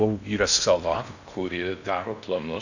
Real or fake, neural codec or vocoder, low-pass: fake; codec, 16 kHz in and 24 kHz out, 0.6 kbps, FocalCodec, streaming, 2048 codes; 7.2 kHz